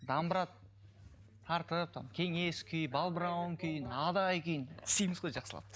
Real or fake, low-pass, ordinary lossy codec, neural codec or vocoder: real; none; none; none